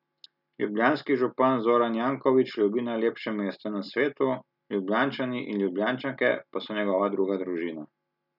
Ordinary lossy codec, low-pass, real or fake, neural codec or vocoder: none; 5.4 kHz; real; none